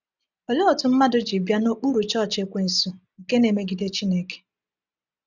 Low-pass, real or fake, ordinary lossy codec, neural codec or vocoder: 7.2 kHz; real; none; none